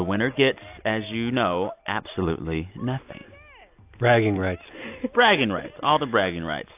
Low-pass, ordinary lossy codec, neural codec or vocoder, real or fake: 3.6 kHz; AAC, 32 kbps; none; real